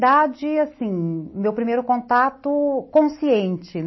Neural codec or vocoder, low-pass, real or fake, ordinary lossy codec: none; 7.2 kHz; real; MP3, 24 kbps